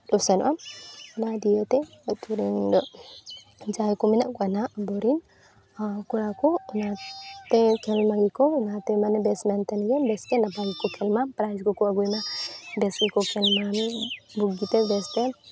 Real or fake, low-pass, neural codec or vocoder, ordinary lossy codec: real; none; none; none